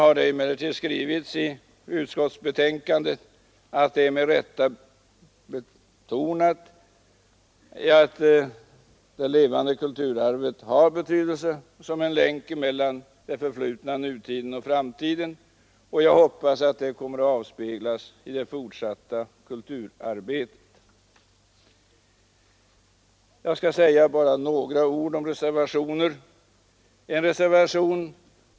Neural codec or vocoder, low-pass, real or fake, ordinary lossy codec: none; none; real; none